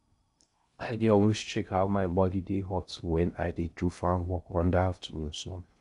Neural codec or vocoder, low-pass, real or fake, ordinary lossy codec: codec, 16 kHz in and 24 kHz out, 0.6 kbps, FocalCodec, streaming, 2048 codes; 10.8 kHz; fake; none